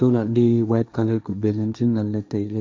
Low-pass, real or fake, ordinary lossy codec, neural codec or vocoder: none; fake; none; codec, 16 kHz, 1.1 kbps, Voila-Tokenizer